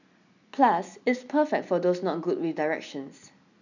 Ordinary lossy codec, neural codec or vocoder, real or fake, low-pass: none; none; real; 7.2 kHz